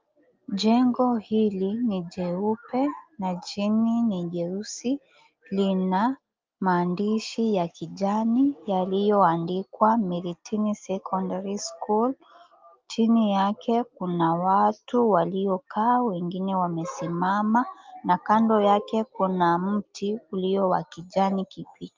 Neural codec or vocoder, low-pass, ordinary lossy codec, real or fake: none; 7.2 kHz; Opus, 24 kbps; real